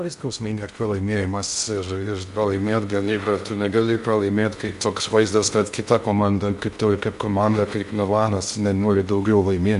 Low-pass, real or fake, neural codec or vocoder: 10.8 kHz; fake; codec, 16 kHz in and 24 kHz out, 0.6 kbps, FocalCodec, streaming, 2048 codes